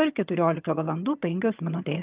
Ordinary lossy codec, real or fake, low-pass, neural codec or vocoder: Opus, 24 kbps; fake; 3.6 kHz; vocoder, 22.05 kHz, 80 mel bands, HiFi-GAN